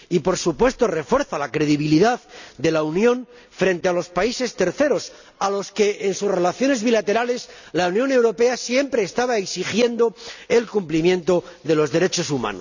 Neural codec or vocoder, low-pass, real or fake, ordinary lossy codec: none; 7.2 kHz; real; none